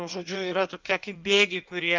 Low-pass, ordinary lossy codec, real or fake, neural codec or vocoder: 7.2 kHz; Opus, 32 kbps; fake; codec, 16 kHz in and 24 kHz out, 1.1 kbps, FireRedTTS-2 codec